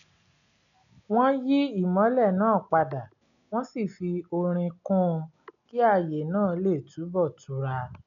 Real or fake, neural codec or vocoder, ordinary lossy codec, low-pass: real; none; none; 7.2 kHz